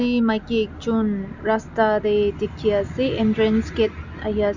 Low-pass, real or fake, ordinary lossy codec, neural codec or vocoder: 7.2 kHz; real; none; none